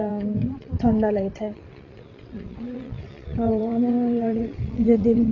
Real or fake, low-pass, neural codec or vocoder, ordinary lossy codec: fake; 7.2 kHz; vocoder, 22.05 kHz, 80 mel bands, WaveNeXt; AAC, 32 kbps